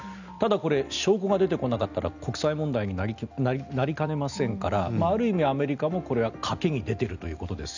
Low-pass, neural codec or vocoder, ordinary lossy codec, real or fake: 7.2 kHz; none; none; real